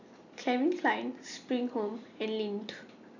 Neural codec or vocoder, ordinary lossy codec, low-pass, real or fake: none; none; 7.2 kHz; real